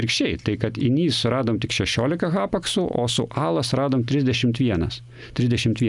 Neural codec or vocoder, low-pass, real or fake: none; 10.8 kHz; real